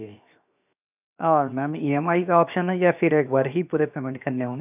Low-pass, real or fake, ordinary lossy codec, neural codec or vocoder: 3.6 kHz; fake; none; codec, 16 kHz, 0.7 kbps, FocalCodec